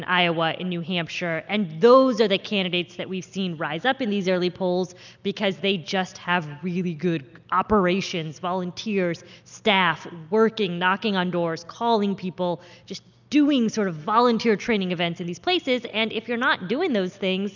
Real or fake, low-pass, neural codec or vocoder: real; 7.2 kHz; none